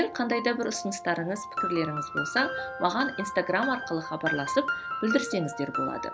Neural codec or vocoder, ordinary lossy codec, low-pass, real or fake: none; none; none; real